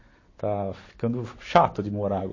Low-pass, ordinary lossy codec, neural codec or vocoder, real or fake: 7.2 kHz; MP3, 32 kbps; none; real